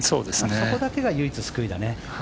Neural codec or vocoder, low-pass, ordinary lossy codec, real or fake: none; none; none; real